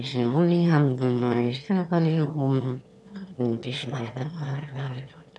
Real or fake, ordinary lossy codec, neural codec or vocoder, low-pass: fake; none; autoencoder, 22.05 kHz, a latent of 192 numbers a frame, VITS, trained on one speaker; none